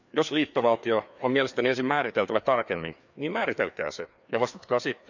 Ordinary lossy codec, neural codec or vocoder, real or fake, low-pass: none; codec, 16 kHz, 2 kbps, FreqCodec, larger model; fake; 7.2 kHz